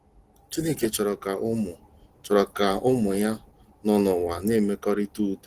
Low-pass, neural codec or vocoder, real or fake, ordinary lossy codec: 14.4 kHz; none; real; Opus, 16 kbps